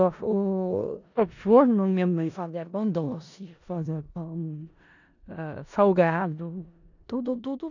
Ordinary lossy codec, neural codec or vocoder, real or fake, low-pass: none; codec, 16 kHz in and 24 kHz out, 0.4 kbps, LongCat-Audio-Codec, four codebook decoder; fake; 7.2 kHz